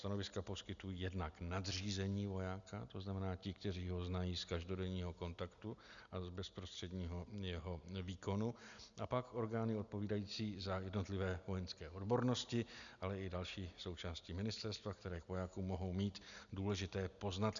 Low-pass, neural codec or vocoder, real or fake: 7.2 kHz; none; real